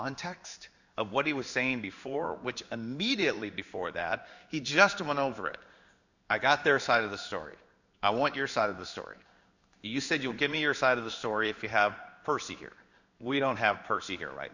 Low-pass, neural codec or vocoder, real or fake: 7.2 kHz; codec, 16 kHz in and 24 kHz out, 1 kbps, XY-Tokenizer; fake